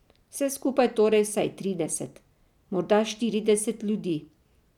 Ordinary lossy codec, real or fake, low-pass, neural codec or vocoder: none; real; 19.8 kHz; none